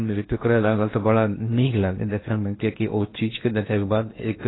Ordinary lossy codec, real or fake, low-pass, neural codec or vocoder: AAC, 16 kbps; fake; 7.2 kHz; codec, 16 kHz in and 24 kHz out, 0.8 kbps, FocalCodec, streaming, 65536 codes